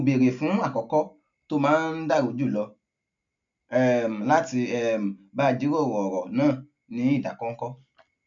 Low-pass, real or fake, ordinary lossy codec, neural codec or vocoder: 7.2 kHz; real; none; none